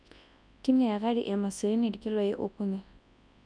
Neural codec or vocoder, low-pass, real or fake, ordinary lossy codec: codec, 24 kHz, 0.9 kbps, WavTokenizer, large speech release; 9.9 kHz; fake; none